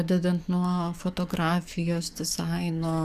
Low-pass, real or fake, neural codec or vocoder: 14.4 kHz; fake; codec, 44.1 kHz, 7.8 kbps, DAC